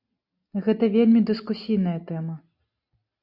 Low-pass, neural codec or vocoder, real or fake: 5.4 kHz; none; real